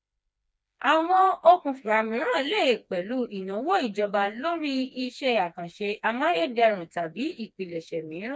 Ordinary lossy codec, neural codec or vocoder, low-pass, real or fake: none; codec, 16 kHz, 2 kbps, FreqCodec, smaller model; none; fake